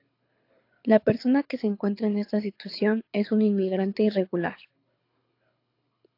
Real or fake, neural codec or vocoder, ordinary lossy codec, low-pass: fake; codec, 24 kHz, 6 kbps, HILCodec; AAC, 32 kbps; 5.4 kHz